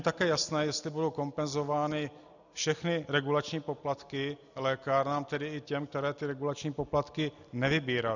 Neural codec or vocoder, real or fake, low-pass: none; real; 7.2 kHz